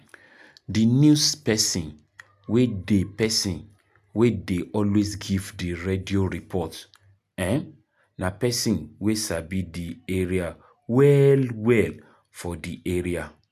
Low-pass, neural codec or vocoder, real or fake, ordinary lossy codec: 14.4 kHz; none; real; none